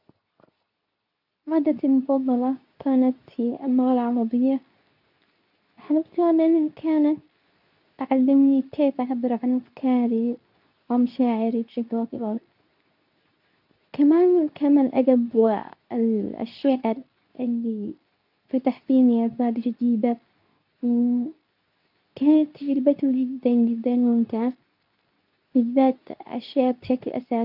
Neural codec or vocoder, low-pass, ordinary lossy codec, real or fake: codec, 24 kHz, 0.9 kbps, WavTokenizer, medium speech release version 2; 5.4 kHz; none; fake